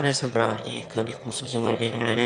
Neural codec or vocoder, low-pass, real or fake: autoencoder, 22.05 kHz, a latent of 192 numbers a frame, VITS, trained on one speaker; 9.9 kHz; fake